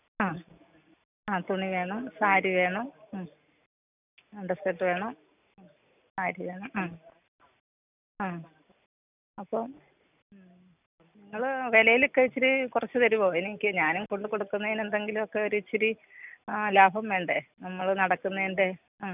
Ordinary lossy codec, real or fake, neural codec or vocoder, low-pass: none; real; none; 3.6 kHz